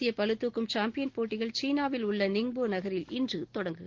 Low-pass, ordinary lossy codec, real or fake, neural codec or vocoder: 7.2 kHz; Opus, 16 kbps; real; none